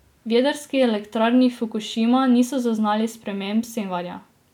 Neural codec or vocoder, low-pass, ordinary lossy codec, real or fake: none; 19.8 kHz; none; real